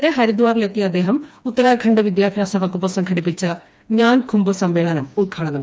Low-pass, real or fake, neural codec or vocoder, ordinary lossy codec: none; fake; codec, 16 kHz, 2 kbps, FreqCodec, smaller model; none